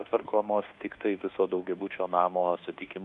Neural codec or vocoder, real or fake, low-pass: codec, 24 kHz, 1.2 kbps, DualCodec; fake; 10.8 kHz